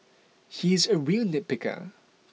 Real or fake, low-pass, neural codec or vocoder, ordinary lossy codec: real; none; none; none